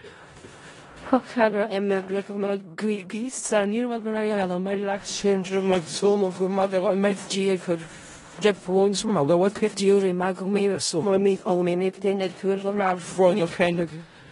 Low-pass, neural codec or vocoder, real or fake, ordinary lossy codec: 10.8 kHz; codec, 16 kHz in and 24 kHz out, 0.4 kbps, LongCat-Audio-Codec, four codebook decoder; fake; AAC, 32 kbps